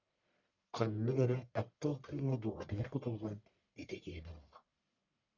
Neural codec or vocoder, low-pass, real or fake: codec, 44.1 kHz, 1.7 kbps, Pupu-Codec; 7.2 kHz; fake